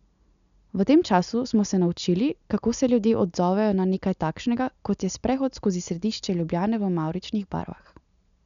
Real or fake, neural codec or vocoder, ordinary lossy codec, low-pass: real; none; none; 7.2 kHz